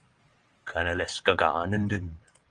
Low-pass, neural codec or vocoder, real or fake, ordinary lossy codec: 9.9 kHz; vocoder, 22.05 kHz, 80 mel bands, WaveNeXt; fake; Opus, 24 kbps